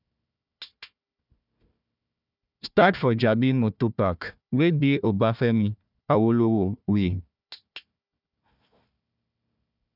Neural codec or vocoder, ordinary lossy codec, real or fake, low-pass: codec, 16 kHz, 1 kbps, FunCodec, trained on Chinese and English, 50 frames a second; none; fake; 5.4 kHz